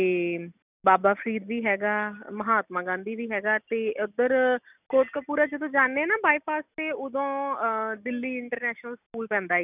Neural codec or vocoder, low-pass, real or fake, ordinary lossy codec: none; 3.6 kHz; real; none